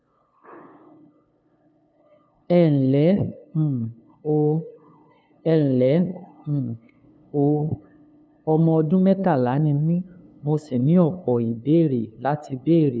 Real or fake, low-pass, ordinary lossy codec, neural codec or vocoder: fake; none; none; codec, 16 kHz, 2 kbps, FunCodec, trained on LibriTTS, 25 frames a second